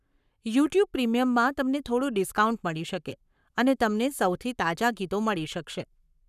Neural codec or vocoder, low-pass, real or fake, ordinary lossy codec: codec, 44.1 kHz, 7.8 kbps, Pupu-Codec; 14.4 kHz; fake; none